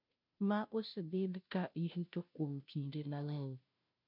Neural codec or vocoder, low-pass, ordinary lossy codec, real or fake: codec, 16 kHz, 0.5 kbps, FunCodec, trained on Chinese and English, 25 frames a second; 5.4 kHz; MP3, 48 kbps; fake